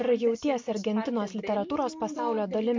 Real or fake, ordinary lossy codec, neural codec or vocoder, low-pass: real; MP3, 48 kbps; none; 7.2 kHz